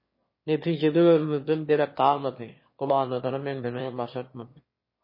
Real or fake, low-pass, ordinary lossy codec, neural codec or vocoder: fake; 5.4 kHz; MP3, 24 kbps; autoencoder, 22.05 kHz, a latent of 192 numbers a frame, VITS, trained on one speaker